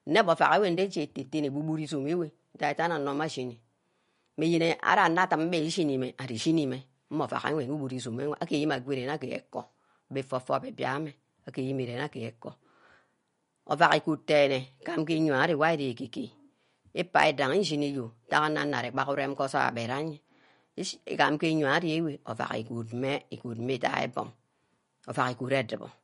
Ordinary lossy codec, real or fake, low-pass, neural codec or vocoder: MP3, 48 kbps; real; 10.8 kHz; none